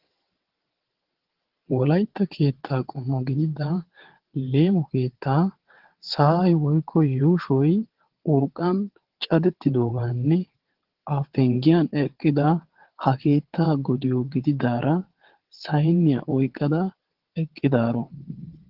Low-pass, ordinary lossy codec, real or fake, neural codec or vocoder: 5.4 kHz; Opus, 16 kbps; fake; vocoder, 22.05 kHz, 80 mel bands, WaveNeXt